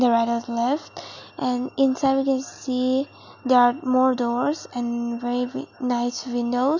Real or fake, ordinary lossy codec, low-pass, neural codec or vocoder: real; none; 7.2 kHz; none